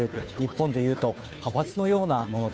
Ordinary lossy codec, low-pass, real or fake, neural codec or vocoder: none; none; fake; codec, 16 kHz, 2 kbps, FunCodec, trained on Chinese and English, 25 frames a second